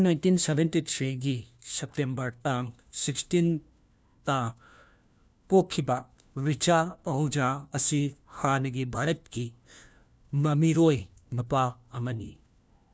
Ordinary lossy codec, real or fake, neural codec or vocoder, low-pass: none; fake; codec, 16 kHz, 1 kbps, FunCodec, trained on LibriTTS, 50 frames a second; none